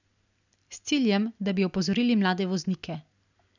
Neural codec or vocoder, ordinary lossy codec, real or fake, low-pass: none; none; real; 7.2 kHz